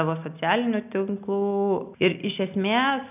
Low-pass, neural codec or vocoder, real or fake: 3.6 kHz; none; real